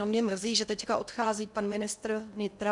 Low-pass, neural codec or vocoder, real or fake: 10.8 kHz; codec, 16 kHz in and 24 kHz out, 0.8 kbps, FocalCodec, streaming, 65536 codes; fake